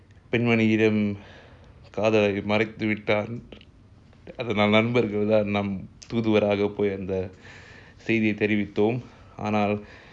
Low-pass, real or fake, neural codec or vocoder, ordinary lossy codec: 9.9 kHz; real; none; none